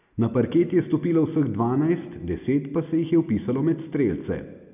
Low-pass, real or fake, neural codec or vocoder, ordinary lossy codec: 3.6 kHz; real; none; none